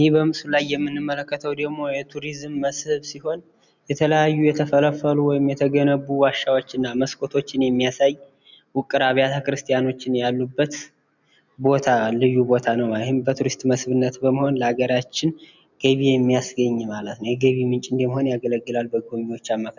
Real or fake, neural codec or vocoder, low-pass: real; none; 7.2 kHz